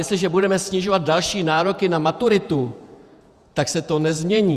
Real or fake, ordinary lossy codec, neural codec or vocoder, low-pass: fake; Opus, 64 kbps; vocoder, 48 kHz, 128 mel bands, Vocos; 14.4 kHz